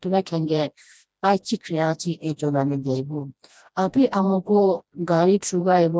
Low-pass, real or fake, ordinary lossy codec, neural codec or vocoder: none; fake; none; codec, 16 kHz, 1 kbps, FreqCodec, smaller model